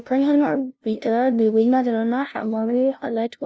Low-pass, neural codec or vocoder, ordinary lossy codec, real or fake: none; codec, 16 kHz, 0.5 kbps, FunCodec, trained on LibriTTS, 25 frames a second; none; fake